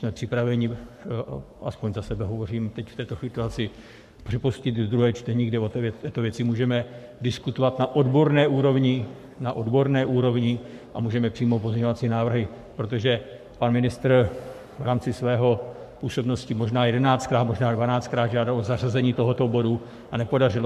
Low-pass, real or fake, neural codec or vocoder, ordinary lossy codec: 14.4 kHz; fake; codec, 44.1 kHz, 7.8 kbps, Pupu-Codec; MP3, 96 kbps